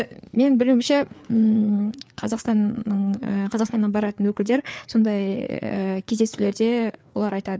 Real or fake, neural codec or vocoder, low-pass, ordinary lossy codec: fake; codec, 16 kHz, 4 kbps, FreqCodec, larger model; none; none